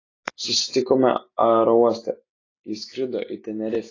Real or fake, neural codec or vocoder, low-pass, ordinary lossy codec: real; none; 7.2 kHz; AAC, 32 kbps